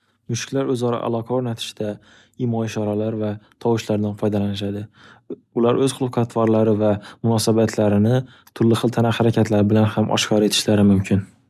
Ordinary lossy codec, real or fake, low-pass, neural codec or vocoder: none; real; 14.4 kHz; none